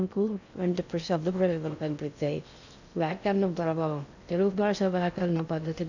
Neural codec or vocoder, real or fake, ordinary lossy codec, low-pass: codec, 16 kHz in and 24 kHz out, 0.6 kbps, FocalCodec, streaming, 2048 codes; fake; none; 7.2 kHz